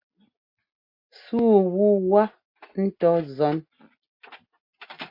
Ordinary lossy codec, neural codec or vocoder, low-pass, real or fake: MP3, 32 kbps; none; 5.4 kHz; real